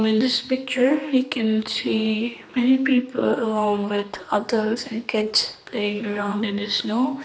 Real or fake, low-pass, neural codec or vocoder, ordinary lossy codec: fake; none; codec, 16 kHz, 2 kbps, X-Codec, HuBERT features, trained on general audio; none